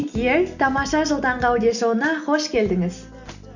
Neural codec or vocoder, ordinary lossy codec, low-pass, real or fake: none; none; 7.2 kHz; real